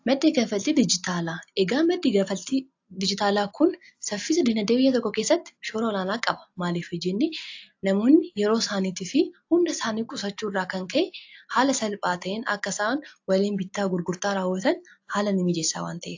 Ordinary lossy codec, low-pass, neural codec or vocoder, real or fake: AAC, 48 kbps; 7.2 kHz; none; real